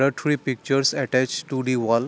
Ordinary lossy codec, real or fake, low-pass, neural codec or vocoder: none; real; none; none